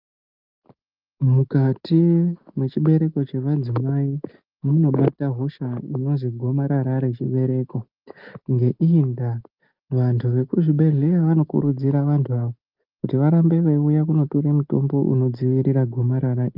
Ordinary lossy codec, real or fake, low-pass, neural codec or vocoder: Opus, 24 kbps; real; 5.4 kHz; none